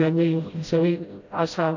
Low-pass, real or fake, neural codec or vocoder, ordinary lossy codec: 7.2 kHz; fake; codec, 16 kHz, 0.5 kbps, FreqCodec, smaller model; AAC, 48 kbps